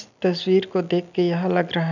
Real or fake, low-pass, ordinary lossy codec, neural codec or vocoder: real; 7.2 kHz; none; none